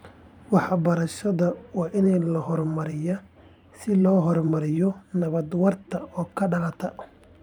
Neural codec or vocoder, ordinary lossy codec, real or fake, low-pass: vocoder, 44.1 kHz, 128 mel bands every 512 samples, BigVGAN v2; none; fake; 19.8 kHz